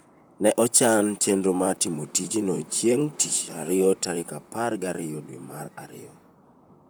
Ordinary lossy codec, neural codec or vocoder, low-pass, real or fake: none; vocoder, 44.1 kHz, 128 mel bands, Pupu-Vocoder; none; fake